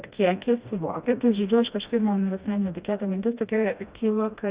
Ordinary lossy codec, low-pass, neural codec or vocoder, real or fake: Opus, 64 kbps; 3.6 kHz; codec, 16 kHz, 1 kbps, FreqCodec, smaller model; fake